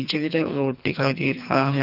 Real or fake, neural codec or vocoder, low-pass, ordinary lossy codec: fake; codec, 24 kHz, 3 kbps, HILCodec; 5.4 kHz; none